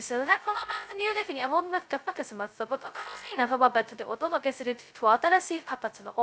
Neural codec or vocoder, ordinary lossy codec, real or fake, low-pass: codec, 16 kHz, 0.2 kbps, FocalCodec; none; fake; none